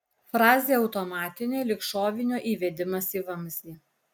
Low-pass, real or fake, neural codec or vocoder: 19.8 kHz; real; none